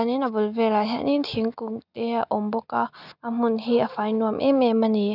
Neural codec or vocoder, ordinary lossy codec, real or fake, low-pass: none; none; real; 5.4 kHz